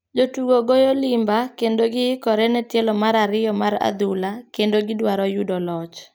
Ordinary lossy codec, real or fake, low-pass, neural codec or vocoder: none; real; none; none